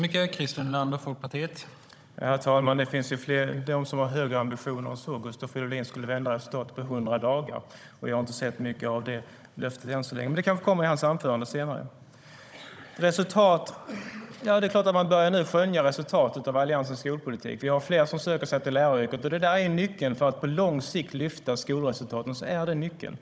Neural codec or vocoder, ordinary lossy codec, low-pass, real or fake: codec, 16 kHz, 16 kbps, FunCodec, trained on Chinese and English, 50 frames a second; none; none; fake